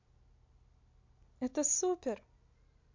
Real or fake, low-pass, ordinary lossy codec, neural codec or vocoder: real; 7.2 kHz; MP3, 48 kbps; none